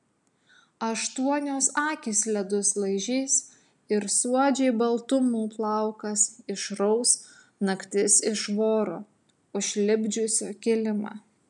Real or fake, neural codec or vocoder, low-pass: real; none; 10.8 kHz